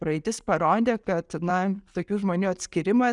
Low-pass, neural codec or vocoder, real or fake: 10.8 kHz; none; real